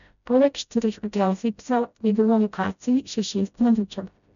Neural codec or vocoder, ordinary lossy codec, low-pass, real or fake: codec, 16 kHz, 0.5 kbps, FreqCodec, smaller model; none; 7.2 kHz; fake